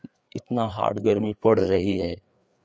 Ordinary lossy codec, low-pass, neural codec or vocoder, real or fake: none; none; codec, 16 kHz, 4 kbps, FreqCodec, larger model; fake